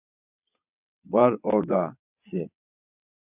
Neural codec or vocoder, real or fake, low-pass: vocoder, 22.05 kHz, 80 mel bands, WaveNeXt; fake; 3.6 kHz